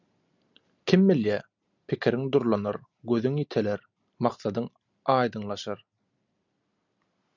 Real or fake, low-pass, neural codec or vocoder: real; 7.2 kHz; none